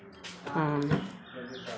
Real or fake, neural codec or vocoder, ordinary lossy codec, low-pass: real; none; none; none